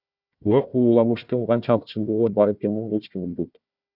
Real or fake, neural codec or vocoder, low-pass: fake; codec, 16 kHz, 1 kbps, FunCodec, trained on Chinese and English, 50 frames a second; 5.4 kHz